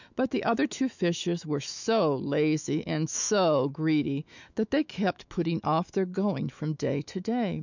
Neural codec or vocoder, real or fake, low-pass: autoencoder, 48 kHz, 128 numbers a frame, DAC-VAE, trained on Japanese speech; fake; 7.2 kHz